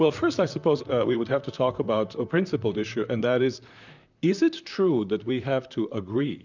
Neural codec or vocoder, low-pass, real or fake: vocoder, 44.1 kHz, 128 mel bands, Pupu-Vocoder; 7.2 kHz; fake